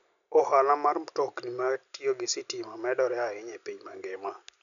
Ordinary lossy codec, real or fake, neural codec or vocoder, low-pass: none; real; none; 7.2 kHz